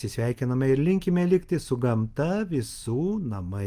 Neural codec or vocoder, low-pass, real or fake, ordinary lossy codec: none; 14.4 kHz; real; Opus, 32 kbps